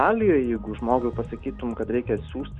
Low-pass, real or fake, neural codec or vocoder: 9.9 kHz; real; none